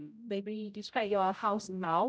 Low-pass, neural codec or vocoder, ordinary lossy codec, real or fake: none; codec, 16 kHz, 0.5 kbps, X-Codec, HuBERT features, trained on general audio; none; fake